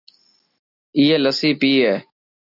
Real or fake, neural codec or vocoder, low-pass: real; none; 5.4 kHz